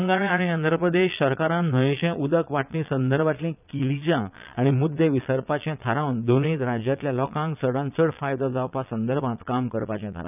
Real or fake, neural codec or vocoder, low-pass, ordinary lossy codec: fake; vocoder, 22.05 kHz, 80 mel bands, Vocos; 3.6 kHz; none